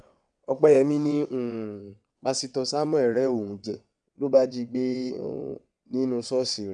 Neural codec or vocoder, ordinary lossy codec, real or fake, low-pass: vocoder, 22.05 kHz, 80 mel bands, WaveNeXt; none; fake; 9.9 kHz